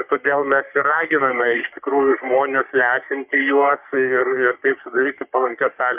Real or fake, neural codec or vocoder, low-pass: fake; codec, 44.1 kHz, 3.4 kbps, Pupu-Codec; 3.6 kHz